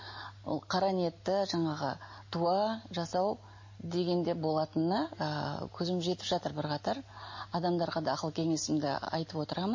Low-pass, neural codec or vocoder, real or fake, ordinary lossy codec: 7.2 kHz; none; real; MP3, 32 kbps